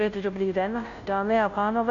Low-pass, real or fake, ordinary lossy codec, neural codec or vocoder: 7.2 kHz; fake; AAC, 48 kbps; codec, 16 kHz, 0.5 kbps, FunCodec, trained on Chinese and English, 25 frames a second